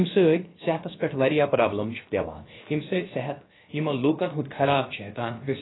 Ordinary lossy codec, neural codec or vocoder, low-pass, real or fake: AAC, 16 kbps; codec, 16 kHz, about 1 kbps, DyCAST, with the encoder's durations; 7.2 kHz; fake